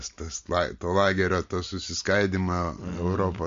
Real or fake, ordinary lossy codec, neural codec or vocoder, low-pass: real; MP3, 48 kbps; none; 7.2 kHz